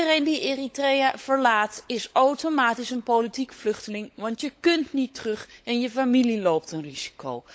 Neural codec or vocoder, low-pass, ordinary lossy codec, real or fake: codec, 16 kHz, 16 kbps, FunCodec, trained on LibriTTS, 50 frames a second; none; none; fake